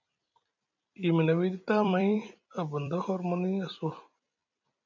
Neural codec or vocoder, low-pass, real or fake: vocoder, 44.1 kHz, 128 mel bands every 256 samples, BigVGAN v2; 7.2 kHz; fake